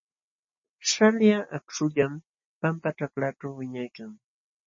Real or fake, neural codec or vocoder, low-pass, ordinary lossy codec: real; none; 7.2 kHz; MP3, 32 kbps